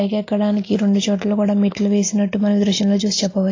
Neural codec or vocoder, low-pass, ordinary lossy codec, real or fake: none; 7.2 kHz; AAC, 32 kbps; real